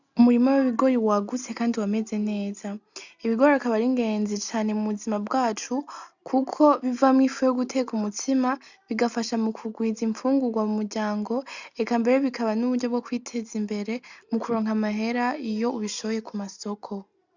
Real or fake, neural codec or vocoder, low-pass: real; none; 7.2 kHz